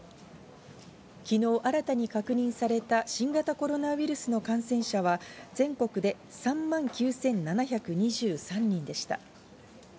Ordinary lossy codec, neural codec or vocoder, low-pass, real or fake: none; none; none; real